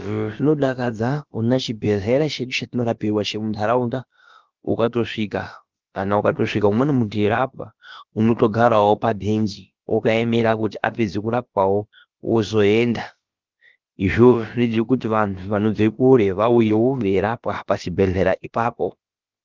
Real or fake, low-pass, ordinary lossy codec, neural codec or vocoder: fake; 7.2 kHz; Opus, 32 kbps; codec, 16 kHz, about 1 kbps, DyCAST, with the encoder's durations